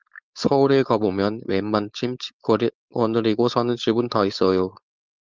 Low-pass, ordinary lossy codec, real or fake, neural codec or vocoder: 7.2 kHz; Opus, 24 kbps; fake; codec, 16 kHz, 4.8 kbps, FACodec